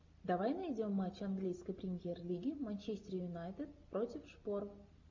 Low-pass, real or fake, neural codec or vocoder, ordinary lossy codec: 7.2 kHz; real; none; MP3, 48 kbps